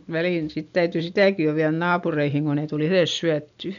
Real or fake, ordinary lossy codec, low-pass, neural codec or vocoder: real; MP3, 96 kbps; 7.2 kHz; none